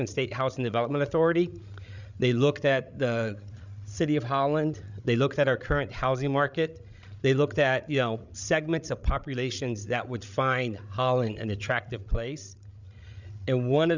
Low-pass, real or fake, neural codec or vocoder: 7.2 kHz; fake; codec, 16 kHz, 16 kbps, FreqCodec, larger model